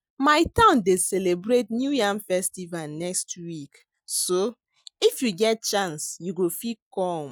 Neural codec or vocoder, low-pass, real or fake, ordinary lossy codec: none; none; real; none